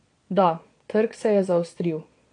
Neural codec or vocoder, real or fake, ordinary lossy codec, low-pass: vocoder, 22.05 kHz, 80 mel bands, Vocos; fake; AAC, 48 kbps; 9.9 kHz